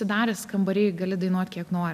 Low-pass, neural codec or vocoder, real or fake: 14.4 kHz; none; real